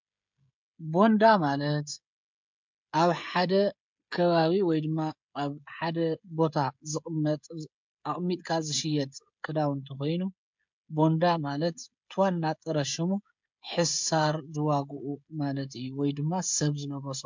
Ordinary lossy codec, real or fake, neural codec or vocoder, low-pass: MP3, 64 kbps; fake; codec, 16 kHz, 16 kbps, FreqCodec, smaller model; 7.2 kHz